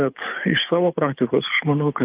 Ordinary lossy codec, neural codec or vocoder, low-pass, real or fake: Opus, 32 kbps; codec, 24 kHz, 6 kbps, HILCodec; 3.6 kHz; fake